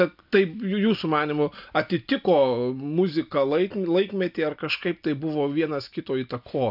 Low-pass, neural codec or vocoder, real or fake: 5.4 kHz; none; real